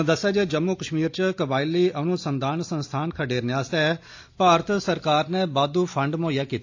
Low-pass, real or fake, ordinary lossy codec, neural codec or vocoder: 7.2 kHz; real; AAC, 48 kbps; none